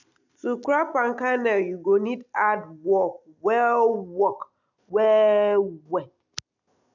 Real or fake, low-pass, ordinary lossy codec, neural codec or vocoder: real; 7.2 kHz; none; none